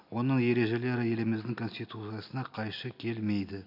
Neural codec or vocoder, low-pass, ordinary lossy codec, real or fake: none; 5.4 kHz; none; real